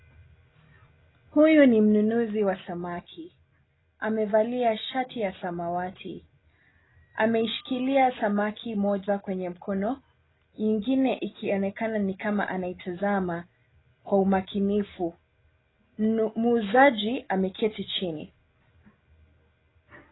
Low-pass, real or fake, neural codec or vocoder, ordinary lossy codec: 7.2 kHz; real; none; AAC, 16 kbps